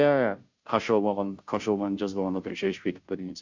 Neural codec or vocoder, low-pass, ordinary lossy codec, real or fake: codec, 16 kHz, 0.5 kbps, FunCodec, trained on Chinese and English, 25 frames a second; 7.2 kHz; MP3, 64 kbps; fake